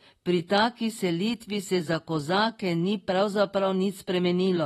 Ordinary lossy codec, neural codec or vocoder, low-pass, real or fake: AAC, 32 kbps; vocoder, 44.1 kHz, 128 mel bands, Pupu-Vocoder; 19.8 kHz; fake